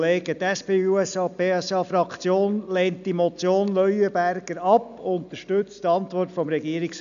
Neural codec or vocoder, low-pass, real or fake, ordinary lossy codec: none; 7.2 kHz; real; none